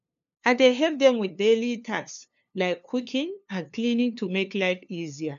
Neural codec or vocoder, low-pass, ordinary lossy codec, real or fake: codec, 16 kHz, 2 kbps, FunCodec, trained on LibriTTS, 25 frames a second; 7.2 kHz; none; fake